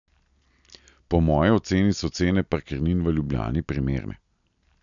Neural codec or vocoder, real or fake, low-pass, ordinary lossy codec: none; real; 7.2 kHz; none